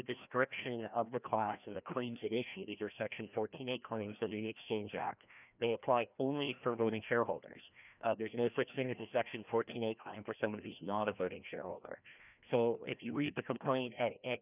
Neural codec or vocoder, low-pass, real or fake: codec, 16 kHz, 1 kbps, FreqCodec, larger model; 3.6 kHz; fake